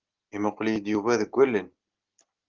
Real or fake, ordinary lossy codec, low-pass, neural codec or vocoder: real; Opus, 32 kbps; 7.2 kHz; none